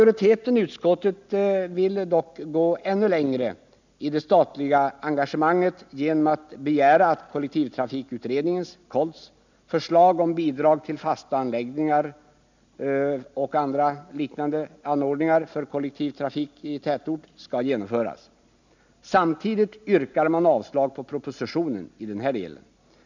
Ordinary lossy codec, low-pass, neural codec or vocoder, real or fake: none; 7.2 kHz; none; real